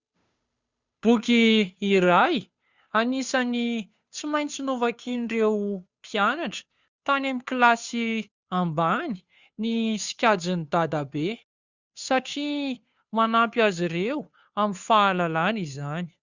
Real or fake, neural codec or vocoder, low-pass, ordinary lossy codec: fake; codec, 16 kHz, 2 kbps, FunCodec, trained on Chinese and English, 25 frames a second; 7.2 kHz; Opus, 64 kbps